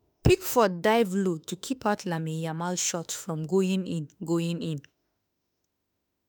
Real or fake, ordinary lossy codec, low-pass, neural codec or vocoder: fake; none; none; autoencoder, 48 kHz, 32 numbers a frame, DAC-VAE, trained on Japanese speech